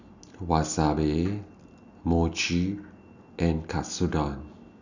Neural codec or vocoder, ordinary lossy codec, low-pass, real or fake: none; none; 7.2 kHz; real